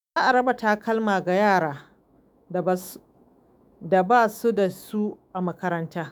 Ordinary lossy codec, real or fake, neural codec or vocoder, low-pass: none; fake; autoencoder, 48 kHz, 128 numbers a frame, DAC-VAE, trained on Japanese speech; none